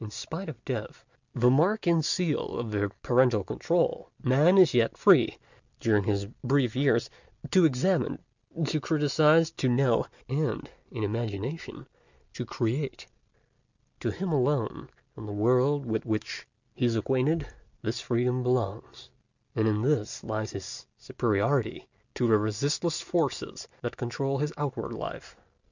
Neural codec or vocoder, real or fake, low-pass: none; real; 7.2 kHz